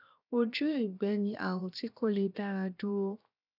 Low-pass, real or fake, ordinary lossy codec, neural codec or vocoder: 5.4 kHz; fake; none; codec, 16 kHz, 0.7 kbps, FocalCodec